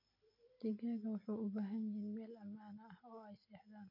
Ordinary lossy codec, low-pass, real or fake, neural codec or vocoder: MP3, 32 kbps; 5.4 kHz; real; none